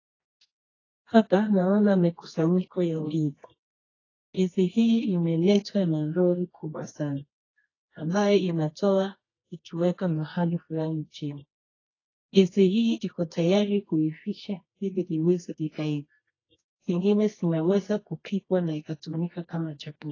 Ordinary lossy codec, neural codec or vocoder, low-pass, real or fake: AAC, 32 kbps; codec, 24 kHz, 0.9 kbps, WavTokenizer, medium music audio release; 7.2 kHz; fake